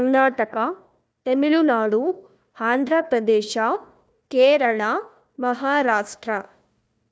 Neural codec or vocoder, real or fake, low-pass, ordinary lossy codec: codec, 16 kHz, 1 kbps, FunCodec, trained on Chinese and English, 50 frames a second; fake; none; none